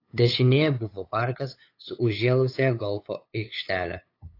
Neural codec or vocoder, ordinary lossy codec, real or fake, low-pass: codec, 16 kHz, 8 kbps, FunCodec, trained on LibriTTS, 25 frames a second; AAC, 32 kbps; fake; 5.4 kHz